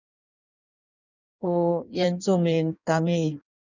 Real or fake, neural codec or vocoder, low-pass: fake; codec, 16 kHz in and 24 kHz out, 1.1 kbps, FireRedTTS-2 codec; 7.2 kHz